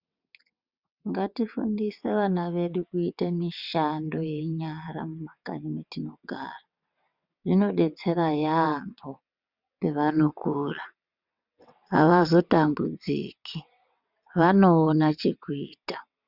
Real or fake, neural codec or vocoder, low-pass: fake; vocoder, 22.05 kHz, 80 mel bands, WaveNeXt; 5.4 kHz